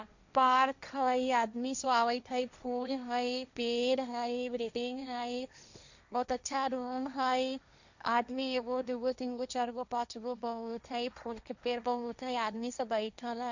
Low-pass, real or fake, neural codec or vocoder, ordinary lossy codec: 7.2 kHz; fake; codec, 16 kHz, 1.1 kbps, Voila-Tokenizer; none